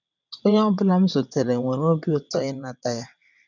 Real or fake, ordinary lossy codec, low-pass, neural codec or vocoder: fake; none; 7.2 kHz; vocoder, 22.05 kHz, 80 mel bands, WaveNeXt